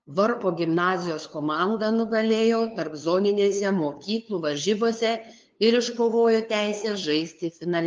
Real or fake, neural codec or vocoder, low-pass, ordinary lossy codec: fake; codec, 16 kHz, 2 kbps, FunCodec, trained on LibriTTS, 25 frames a second; 7.2 kHz; Opus, 24 kbps